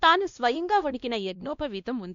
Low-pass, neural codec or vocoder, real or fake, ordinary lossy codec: 7.2 kHz; codec, 16 kHz, 1 kbps, X-Codec, WavLM features, trained on Multilingual LibriSpeech; fake; MP3, 64 kbps